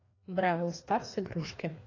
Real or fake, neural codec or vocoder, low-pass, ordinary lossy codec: fake; codec, 16 kHz, 2 kbps, FreqCodec, larger model; 7.2 kHz; AAC, 32 kbps